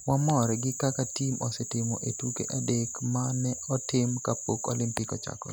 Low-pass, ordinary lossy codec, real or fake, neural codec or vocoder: none; none; real; none